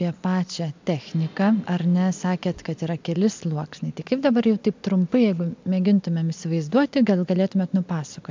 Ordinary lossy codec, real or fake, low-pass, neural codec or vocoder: MP3, 64 kbps; real; 7.2 kHz; none